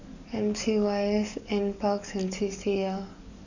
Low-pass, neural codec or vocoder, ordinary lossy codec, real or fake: 7.2 kHz; codec, 44.1 kHz, 7.8 kbps, DAC; none; fake